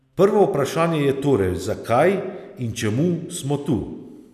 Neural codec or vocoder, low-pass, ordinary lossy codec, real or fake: none; 14.4 kHz; none; real